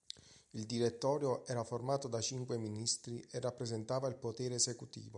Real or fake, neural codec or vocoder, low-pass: real; none; 10.8 kHz